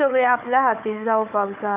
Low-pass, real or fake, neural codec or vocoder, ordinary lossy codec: 3.6 kHz; fake; codec, 16 kHz, 4 kbps, FunCodec, trained on Chinese and English, 50 frames a second; none